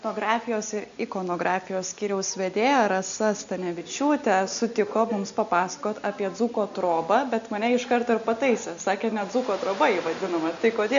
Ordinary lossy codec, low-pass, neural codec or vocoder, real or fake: MP3, 64 kbps; 7.2 kHz; none; real